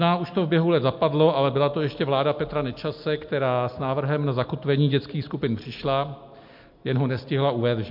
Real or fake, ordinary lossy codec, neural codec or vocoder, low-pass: real; MP3, 48 kbps; none; 5.4 kHz